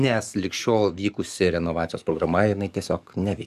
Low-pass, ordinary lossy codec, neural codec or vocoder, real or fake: 14.4 kHz; Opus, 64 kbps; codec, 44.1 kHz, 7.8 kbps, DAC; fake